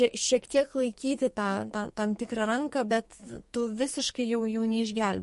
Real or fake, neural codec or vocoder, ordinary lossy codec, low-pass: fake; codec, 44.1 kHz, 2.6 kbps, SNAC; MP3, 48 kbps; 14.4 kHz